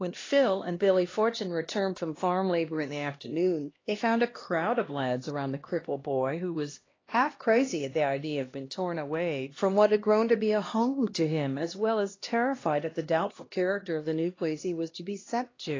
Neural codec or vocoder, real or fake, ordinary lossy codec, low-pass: codec, 16 kHz, 1 kbps, X-Codec, HuBERT features, trained on LibriSpeech; fake; AAC, 32 kbps; 7.2 kHz